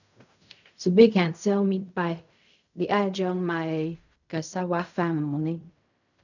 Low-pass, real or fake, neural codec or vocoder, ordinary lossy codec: 7.2 kHz; fake; codec, 16 kHz in and 24 kHz out, 0.4 kbps, LongCat-Audio-Codec, fine tuned four codebook decoder; none